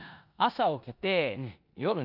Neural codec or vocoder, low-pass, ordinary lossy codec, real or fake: codec, 16 kHz in and 24 kHz out, 0.9 kbps, LongCat-Audio-Codec, four codebook decoder; 5.4 kHz; none; fake